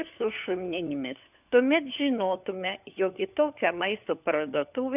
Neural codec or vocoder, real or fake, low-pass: codec, 16 kHz, 4 kbps, FunCodec, trained on LibriTTS, 50 frames a second; fake; 3.6 kHz